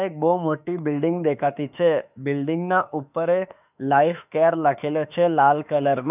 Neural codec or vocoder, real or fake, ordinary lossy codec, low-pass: autoencoder, 48 kHz, 32 numbers a frame, DAC-VAE, trained on Japanese speech; fake; none; 3.6 kHz